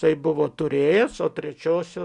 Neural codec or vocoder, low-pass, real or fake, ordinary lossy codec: none; 10.8 kHz; real; MP3, 96 kbps